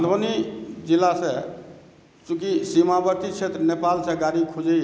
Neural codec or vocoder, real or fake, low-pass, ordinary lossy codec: none; real; none; none